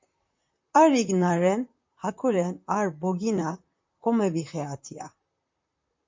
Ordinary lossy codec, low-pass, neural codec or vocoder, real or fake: MP3, 48 kbps; 7.2 kHz; vocoder, 44.1 kHz, 128 mel bands, Pupu-Vocoder; fake